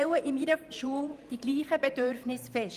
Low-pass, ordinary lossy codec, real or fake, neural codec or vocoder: 14.4 kHz; Opus, 32 kbps; fake; vocoder, 44.1 kHz, 128 mel bands every 512 samples, BigVGAN v2